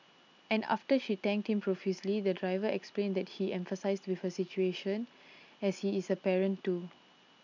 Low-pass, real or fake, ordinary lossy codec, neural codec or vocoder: 7.2 kHz; real; none; none